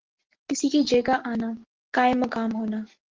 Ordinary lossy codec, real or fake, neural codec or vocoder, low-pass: Opus, 16 kbps; real; none; 7.2 kHz